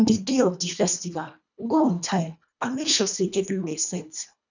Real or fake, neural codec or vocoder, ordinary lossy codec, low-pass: fake; codec, 24 kHz, 1.5 kbps, HILCodec; none; 7.2 kHz